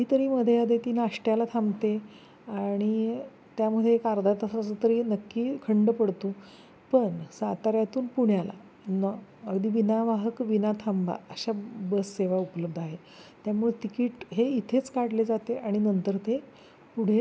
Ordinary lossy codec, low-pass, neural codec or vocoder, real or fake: none; none; none; real